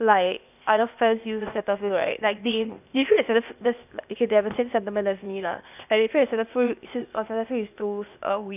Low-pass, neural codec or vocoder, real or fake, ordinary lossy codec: 3.6 kHz; codec, 16 kHz, 0.8 kbps, ZipCodec; fake; none